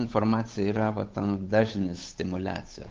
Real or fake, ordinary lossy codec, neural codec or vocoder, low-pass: fake; Opus, 32 kbps; codec, 16 kHz, 8 kbps, FunCodec, trained on Chinese and English, 25 frames a second; 7.2 kHz